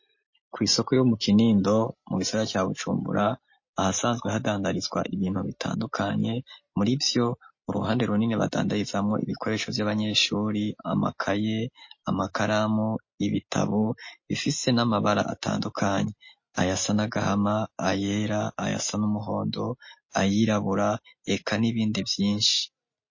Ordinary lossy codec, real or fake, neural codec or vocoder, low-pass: MP3, 32 kbps; real; none; 7.2 kHz